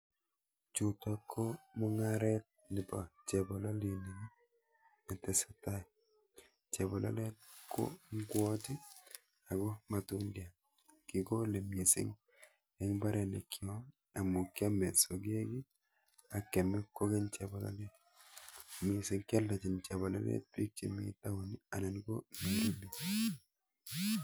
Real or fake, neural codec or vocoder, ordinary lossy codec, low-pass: real; none; none; none